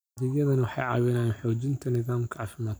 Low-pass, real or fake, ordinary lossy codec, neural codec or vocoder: none; fake; none; vocoder, 44.1 kHz, 128 mel bands every 256 samples, BigVGAN v2